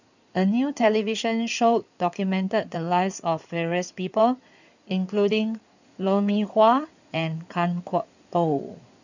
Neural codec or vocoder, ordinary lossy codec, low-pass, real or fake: codec, 16 kHz in and 24 kHz out, 2.2 kbps, FireRedTTS-2 codec; none; 7.2 kHz; fake